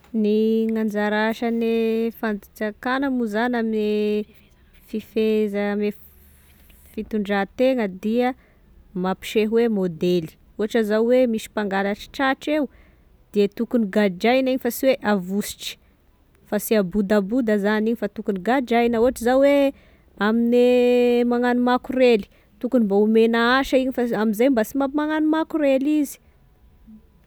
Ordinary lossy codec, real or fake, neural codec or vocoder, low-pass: none; real; none; none